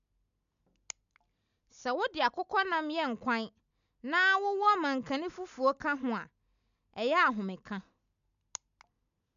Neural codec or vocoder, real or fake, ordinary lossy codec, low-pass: none; real; MP3, 96 kbps; 7.2 kHz